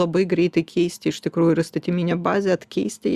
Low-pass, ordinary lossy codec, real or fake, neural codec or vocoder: 14.4 kHz; Opus, 64 kbps; real; none